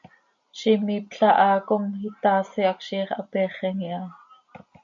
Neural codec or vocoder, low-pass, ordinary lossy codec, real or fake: none; 7.2 kHz; MP3, 48 kbps; real